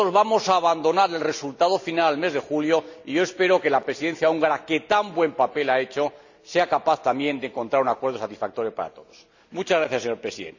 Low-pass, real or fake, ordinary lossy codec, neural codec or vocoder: 7.2 kHz; real; none; none